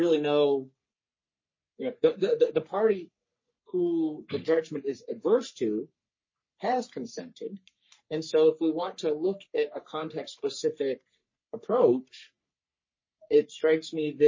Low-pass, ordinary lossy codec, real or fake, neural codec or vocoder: 7.2 kHz; MP3, 32 kbps; fake; codec, 44.1 kHz, 3.4 kbps, Pupu-Codec